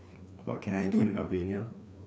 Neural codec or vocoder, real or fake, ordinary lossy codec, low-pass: codec, 16 kHz, 2 kbps, FreqCodec, larger model; fake; none; none